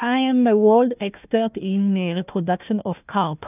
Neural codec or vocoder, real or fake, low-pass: codec, 16 kHz, 1 kbps, FunCodec, trained on Chinese and English, 50 frames a second; fake; 3.6 kHz